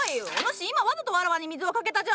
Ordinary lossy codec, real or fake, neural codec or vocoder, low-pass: none; real; none; none